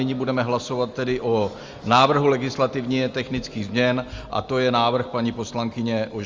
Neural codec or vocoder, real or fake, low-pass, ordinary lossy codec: none; real; 7.2 kHz; Opus, 32 kbps